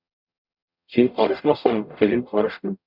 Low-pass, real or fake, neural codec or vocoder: 5.4 kHz; fake; codec, 44.1 kHz, 0.9 kbps, DAC